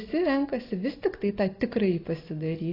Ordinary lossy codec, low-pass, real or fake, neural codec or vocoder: AAC, 24 kbps; 5.4 kHz; real; none